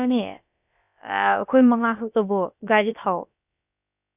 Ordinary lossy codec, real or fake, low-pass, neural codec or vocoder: none; fake; 3.6 kHz; codec, 16 kHz, about 1 kbps, DyCAST, with the encoder's durations